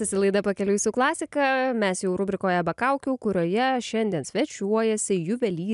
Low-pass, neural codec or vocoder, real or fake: 10.8 kHz; none; real